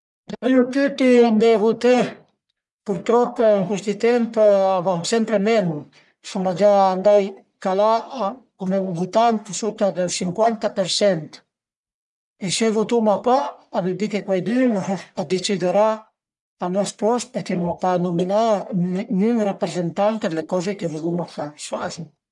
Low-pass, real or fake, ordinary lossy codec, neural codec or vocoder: 10.8 kHz; fake; none; codec, 44.1 kHz, 1.7 kbps, Pupu-Codec